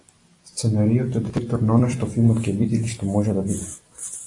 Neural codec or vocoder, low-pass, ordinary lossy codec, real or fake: vocoder, 44.1 kHz, 128 mel bands every 256 samples, BigVGAN v2; 10.8 kHz; AAC, 48 kbps; fake